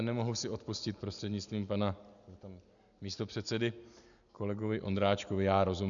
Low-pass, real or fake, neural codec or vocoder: 7.2 kHz; real; none